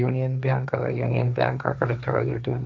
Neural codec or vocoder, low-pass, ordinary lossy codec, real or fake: codec, 16 kHz, 1.1 kbps, Voila-Tokenizer; none; none; fake